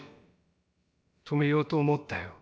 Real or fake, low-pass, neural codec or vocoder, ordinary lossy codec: fake; none; codec, 16 kHz, about 1 kbps, DyCAST, with the encoder's durations; none